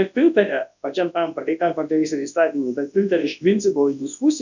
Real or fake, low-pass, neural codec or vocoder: fake; 7.2 kHz; codec, 24 kHz, 0.9 kbps, WavTokenizer, large speech release